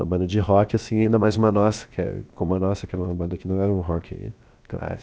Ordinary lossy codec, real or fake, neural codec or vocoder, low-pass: none; fake; codec, 16 kHz, about 1 kbps, DyCAST, with the encoder's durations; none